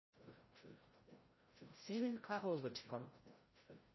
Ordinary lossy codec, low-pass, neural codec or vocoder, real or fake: MP3, 24 kbps; 7.2 kHz; codec, 16 kHz, 0.5 kbps, FreqCodec, larger model; fake